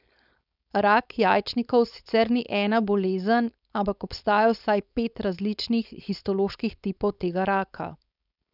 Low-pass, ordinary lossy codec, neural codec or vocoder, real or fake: 5.4 kHz; none; codec, 16 kHz, 4.8 kbps, FACodec; fake